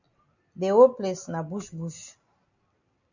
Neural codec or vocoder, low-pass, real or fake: none; 7.2 kHz; real